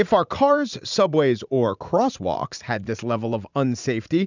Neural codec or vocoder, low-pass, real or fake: vocoder, 44.1 kHz, 80 mel bands, Vocos; 7.2 kHz; fake